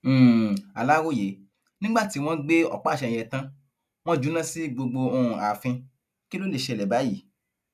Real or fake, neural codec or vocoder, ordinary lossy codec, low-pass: real; none; none; 14.4 kHz